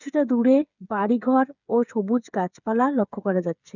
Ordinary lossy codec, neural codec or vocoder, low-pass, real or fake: none; codec, 16 kHz, 8 kbps, FreqCodec, smaller model; 7.2 kHz; fake